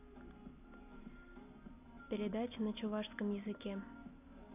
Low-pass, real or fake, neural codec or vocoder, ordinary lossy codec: 3.6 kHz; real; none; none